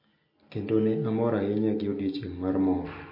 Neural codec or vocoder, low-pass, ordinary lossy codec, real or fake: none; 5.4 kHz; MP3, 32 kbps; real